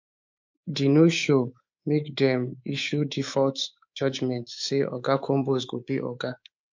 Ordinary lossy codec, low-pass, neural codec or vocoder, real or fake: MP3, 48 kbps; 7.2 kHz; codec, 24 kHz, 3.1 kbps, DualCodec; fake